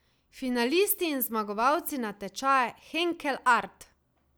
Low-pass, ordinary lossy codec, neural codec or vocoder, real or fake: none; none; none; real